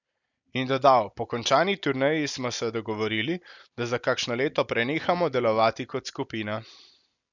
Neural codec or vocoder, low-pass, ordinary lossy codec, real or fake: none; 7.2 kHz; none; real